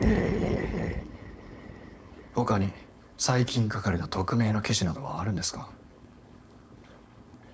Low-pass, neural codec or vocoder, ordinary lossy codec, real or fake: none; codec, 16 kHz, 4.8 kbps, FACodec; none; fake